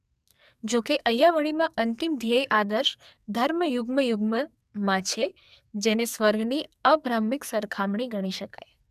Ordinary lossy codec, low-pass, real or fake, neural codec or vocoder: none; 14.4 kHz; fake; codec, 44.1 kHz, 2.6 kbps, SNAC